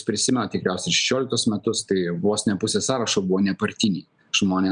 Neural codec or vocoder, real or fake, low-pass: none; real; 9.9 kHz